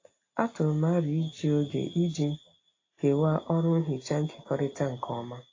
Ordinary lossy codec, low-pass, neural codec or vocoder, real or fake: AAC, 32 kbps; 7.2 kHz; none; real